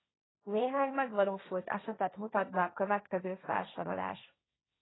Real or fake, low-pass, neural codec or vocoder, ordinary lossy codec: fake; 7.2 kHz; codec, 16 kHz, 1.1 kbps, Voila-Tokenizer; AAC, 16 kbps